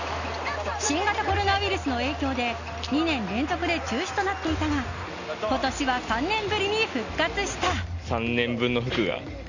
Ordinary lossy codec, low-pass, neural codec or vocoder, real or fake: none; 7.2 kHz; none; real